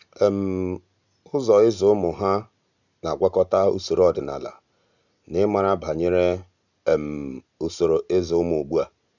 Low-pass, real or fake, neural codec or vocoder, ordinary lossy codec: 7.2 kHz; real; none; none